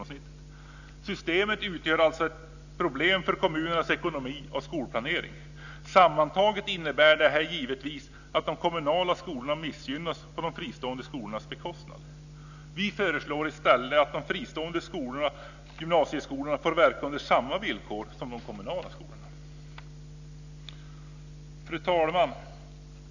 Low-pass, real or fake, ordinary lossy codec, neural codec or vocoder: 7.2 kHz; real; none; none